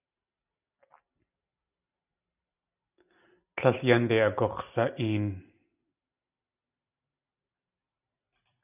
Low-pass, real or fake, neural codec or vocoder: 3.6 kHz; real; none